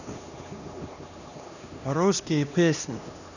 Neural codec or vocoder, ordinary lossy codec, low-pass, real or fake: codec, 16 kHz, 1 kbps, X-Codec, HuBERT features, trained on LibriSpeech; none; 7.2 kHz; fake